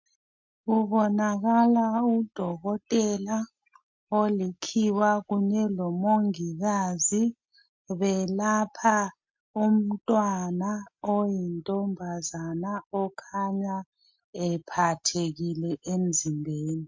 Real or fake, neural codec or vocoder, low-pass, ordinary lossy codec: real; none; 7.2 kHz; MP3, 48 kbps